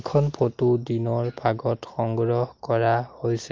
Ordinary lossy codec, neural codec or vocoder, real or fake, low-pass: Opus, 32 kbps; none; real; 7.2 kHz